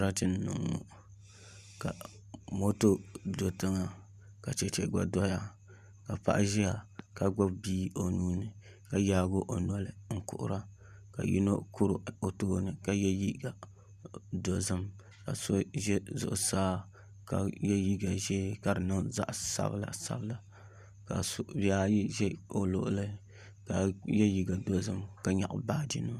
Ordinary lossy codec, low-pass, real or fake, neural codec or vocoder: Opus, 64 kbps; 14.4 kHz; real; none